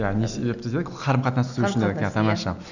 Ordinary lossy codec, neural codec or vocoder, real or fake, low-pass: none; none; real; 7.2 kHz